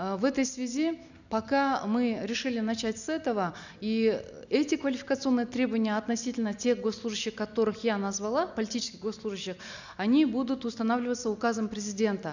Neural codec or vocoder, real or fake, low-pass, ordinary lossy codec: none; real; 7.2 kHz; none